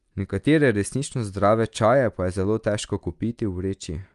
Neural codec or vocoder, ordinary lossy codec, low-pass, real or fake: none; Opus, 32 kbps; 10.8 kHz; real